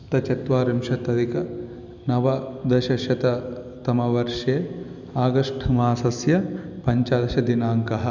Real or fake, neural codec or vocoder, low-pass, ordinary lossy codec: real; none; 7.2 kHz; none